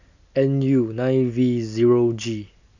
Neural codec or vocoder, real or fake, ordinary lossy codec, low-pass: none; real; none; 7.2 kHz